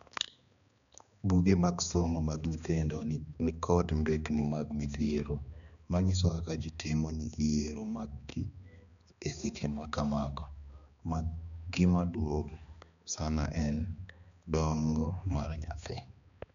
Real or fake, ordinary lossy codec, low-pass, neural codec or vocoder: fake; none; 7.2 kHz; codec, 16 kHz, 2 kbps, X-Codec, HuBERT features, trained on balanced general audio